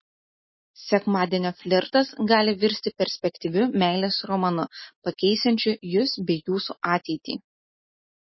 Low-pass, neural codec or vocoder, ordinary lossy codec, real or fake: 7.2 kHz; none; MP3, 24 kbps; real